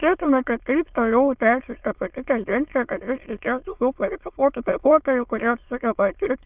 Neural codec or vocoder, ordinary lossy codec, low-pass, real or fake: autoencoder, 22.05 kHz, a latent of 192 numbers a frame, VITS, trained on many speakers; Opus, 32 kbps; 3.6 kHz; fake